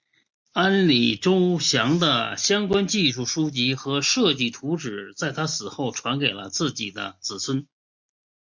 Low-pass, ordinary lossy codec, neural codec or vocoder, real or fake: 7.2 kHz; MP3, 64 kbps; none; real